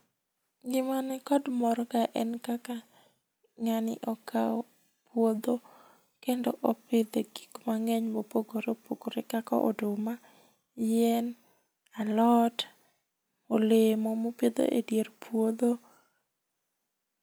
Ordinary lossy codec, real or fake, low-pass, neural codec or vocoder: none; real; none; none